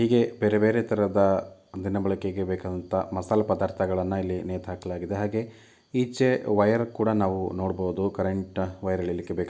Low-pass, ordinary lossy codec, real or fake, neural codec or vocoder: none; none; real; none